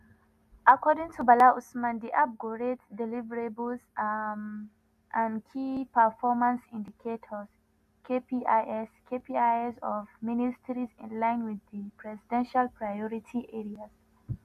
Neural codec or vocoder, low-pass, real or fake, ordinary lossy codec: none; 14.4 kHz; real; none